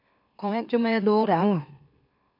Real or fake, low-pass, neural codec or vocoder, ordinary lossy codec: fake; 5.4 kHz; autoencoder, 44.1 kHz, a latent of 192 numbers a frame, MeloTTS; AAC, 32 kbps